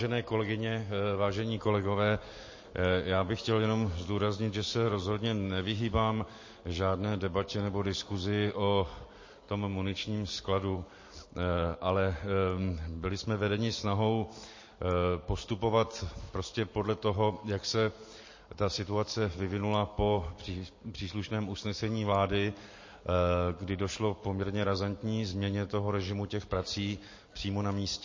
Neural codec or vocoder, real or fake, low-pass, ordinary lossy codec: none; real; 7.2 kHz; MP3, 32 kbps